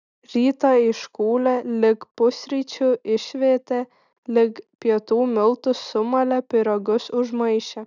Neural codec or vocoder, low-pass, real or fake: none; 7.2 kHz; real